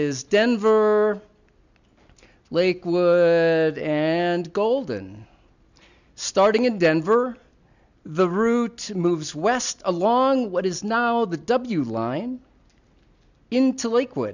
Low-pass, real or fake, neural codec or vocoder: 7.2 kHz; real; none